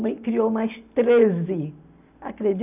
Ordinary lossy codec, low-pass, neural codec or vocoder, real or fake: none; 3.6 kHz; none; real